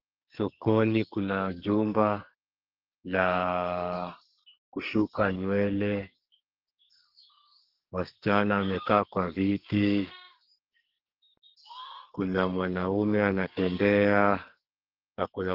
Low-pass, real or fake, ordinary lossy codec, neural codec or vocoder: 5.4 kHz; fake; Opus, 16 kbps; codec, 44.1 kHz, 2.6 kbps, SNAC